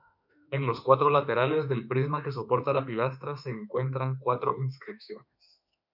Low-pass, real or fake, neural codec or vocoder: 5.4 kHz; fake; autoencoder, 48 kHz, 32 numbers a frame, DAC-VAE, trained on Japanese speech